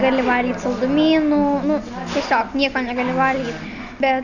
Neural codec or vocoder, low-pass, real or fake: none; 7.2 kHz; real